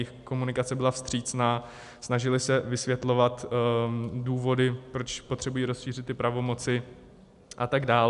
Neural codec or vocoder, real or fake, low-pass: none; real; 10.8 kHz